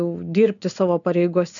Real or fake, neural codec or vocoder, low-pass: real; none; 7.2 kHz